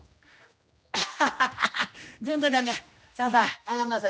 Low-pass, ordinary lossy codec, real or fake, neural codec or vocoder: none; none; fake; codec, 16 kHz, 1 kbps, X-Codec, HuBERT features, trained on general audio